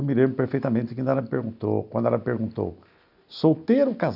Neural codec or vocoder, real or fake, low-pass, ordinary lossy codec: none; real; 5.4 kHz; none